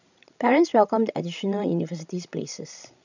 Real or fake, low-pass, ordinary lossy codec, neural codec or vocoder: fake; 7.2 kHz; none; codec, 16 kHz, 16 kbps, FreqCodec, larger model